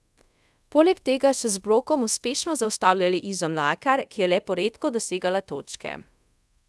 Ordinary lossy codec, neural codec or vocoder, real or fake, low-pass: none; codec, 24 kHz, 0.5 kbps, DualCodec; fake; none